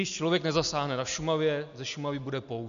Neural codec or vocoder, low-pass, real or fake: none; 7.2 kHz; real